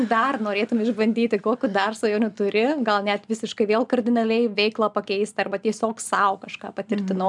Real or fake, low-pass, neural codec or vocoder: real; 10.8 kHz; none